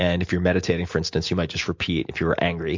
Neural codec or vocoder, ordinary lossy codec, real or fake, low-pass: none; MP3, 48 kbps; real; 7.2 kHz